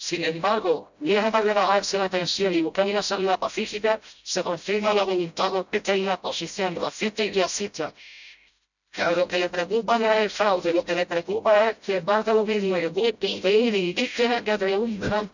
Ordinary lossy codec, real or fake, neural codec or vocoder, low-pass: none; fake; codec, 16 kHz, 0.5 kbps, FreqCodec, smaller model; 7.2 kHz